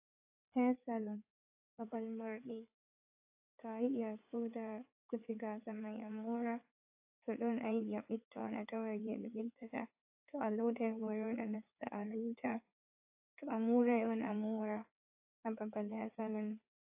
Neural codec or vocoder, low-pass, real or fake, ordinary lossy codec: codec, 16 kHz in and 24 kHz out, 2.2 kbps, FireRedTTS-2 codec; 3.6 kHz; fake; AAC, 32 kbps